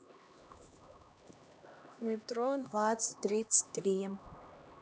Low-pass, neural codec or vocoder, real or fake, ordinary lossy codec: none; codec, 16 kHz, 1 kbps, X-Codec, HuBERT features, trained on LibriSpeech; fake; none